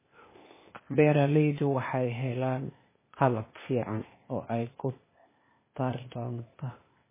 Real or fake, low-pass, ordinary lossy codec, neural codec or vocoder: fake; 3.6 kHz; MP3, 16 kbps; codec, 16 kHz, 0.8 kbps, ZipCodec